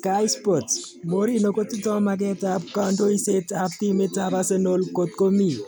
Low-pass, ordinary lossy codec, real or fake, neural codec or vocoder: none; none; real; none